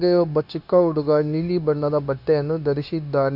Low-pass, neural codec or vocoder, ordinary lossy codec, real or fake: 5.4 kHz; codec, 16 kHz, 0.9 kbps, LongCat-Audio-Codec; none; fake